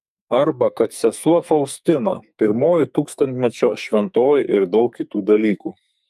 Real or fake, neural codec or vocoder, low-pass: fake; codec, 44.1 kHz, 2.6 kbps, SNAC; 14.4 kHz